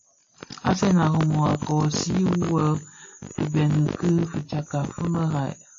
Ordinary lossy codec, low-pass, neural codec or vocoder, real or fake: AAC, 48 kbps; 7.2 kHz; none; real